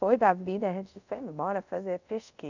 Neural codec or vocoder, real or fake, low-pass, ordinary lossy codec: codec, 24 kHz, 0.5 kbps, DualCodec; fake; 7.2 kHz; none